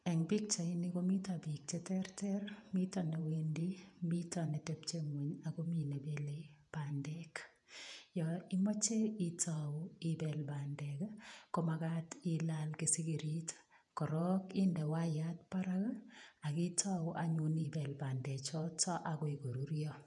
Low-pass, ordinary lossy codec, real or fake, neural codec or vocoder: 10.8 kHz; none; fake; vocoder, 48 kHz, 128 mel bands, Vocos